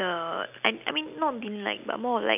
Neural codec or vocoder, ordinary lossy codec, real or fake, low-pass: none; none; real; 3.6 kHz